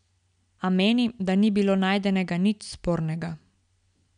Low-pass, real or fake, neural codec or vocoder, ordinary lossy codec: 9.9 kHz; real; none; none